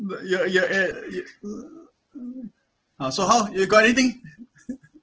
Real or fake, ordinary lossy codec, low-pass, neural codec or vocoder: real; Opus, 16 kbps; 7.2 kHz; none